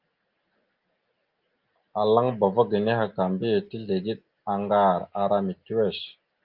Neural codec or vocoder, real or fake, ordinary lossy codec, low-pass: none; real; Opus, 32 kbps; 5.4 kHz